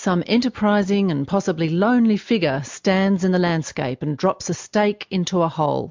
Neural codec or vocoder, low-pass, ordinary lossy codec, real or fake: none; 7.2 kHz; MP3, 64 kbps; real